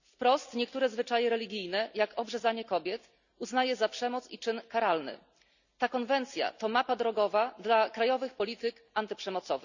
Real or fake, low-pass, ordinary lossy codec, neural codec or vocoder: real; 7.2 kHz; none; none